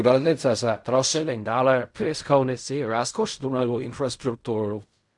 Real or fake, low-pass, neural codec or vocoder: fake; 10.8 kHz; codec, 16 kHz in and 24 kHz out, 0.4 kbps, LongCat-Audio-Codec, fine tuned four codebook decoder